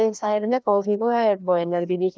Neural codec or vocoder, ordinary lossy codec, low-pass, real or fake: codec, 16 kHz, 1 kbps, FreqCodec, larger model; none; none; fake